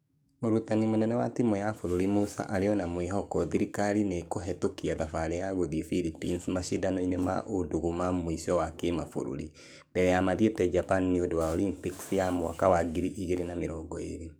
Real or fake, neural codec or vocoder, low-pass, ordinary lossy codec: fake; codec, 44.1 kHz, 7.8 kbps, DAC; none; none